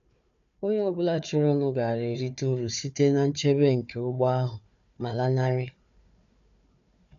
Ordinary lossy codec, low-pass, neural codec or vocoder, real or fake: none; 7.2 kHz; codec, 16 kHz, 4 kbps, FunCodec, trained on Chinese and English, 50 frames a second; fake